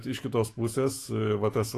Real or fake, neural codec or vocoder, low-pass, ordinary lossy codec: fake; autoencoder, 48 kHz, 128 numbers a frame, DAC-VAE, trained on Japanese speech; 14.4 kHz; AAC, 48 kbps